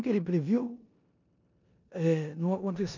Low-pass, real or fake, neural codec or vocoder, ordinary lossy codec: 7.2 kHz; fake; codec, 16 kHz in and 24 kHz out, 0.9 kbps, LongCat-Audio-Codec, four codebook decoder; none